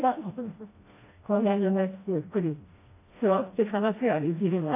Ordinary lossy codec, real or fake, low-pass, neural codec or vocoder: AAC, 24 kbps; fake; 3.6 kHz; codec, 16 kHz, 1 kbps, FreqCodec, smaller model